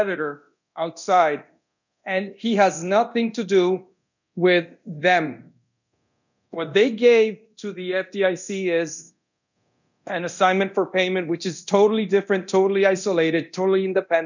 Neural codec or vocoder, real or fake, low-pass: codec, 24 kHz, 0.9 kbps, DualCodec; fake; 7.2 kHz